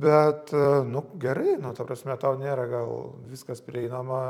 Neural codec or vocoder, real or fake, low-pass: vocoder, 44.1 kHz, 128 mel bands every 256 samples, BigVGAN v2; fake; 19.8 kHz